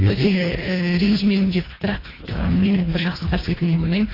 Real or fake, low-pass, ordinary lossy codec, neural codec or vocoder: fake; 5.4 kHz; AAC, 24 kbps; codec, 24 kHz, 1.5 kbps, HILCodec